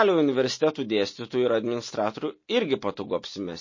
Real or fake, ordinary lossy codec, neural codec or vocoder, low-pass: real; MP3, 32 kbps; none; 7.2 kHz